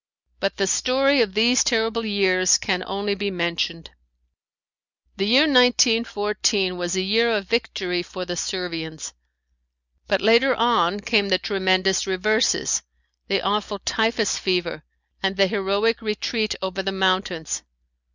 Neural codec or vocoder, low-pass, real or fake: none; 7.2 kHz; real